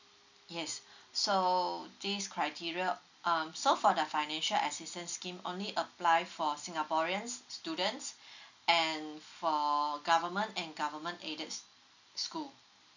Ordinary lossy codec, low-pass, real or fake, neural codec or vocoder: none; 7.2 kHz; real; none